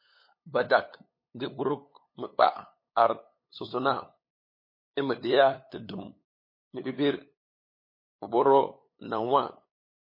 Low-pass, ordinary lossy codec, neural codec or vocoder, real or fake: 5.4 kHz; MP3, 24 kbps; codec, 16 kHz, 8 kbps, FunCodec, trained on LibriTTS, 25 frames a second; fake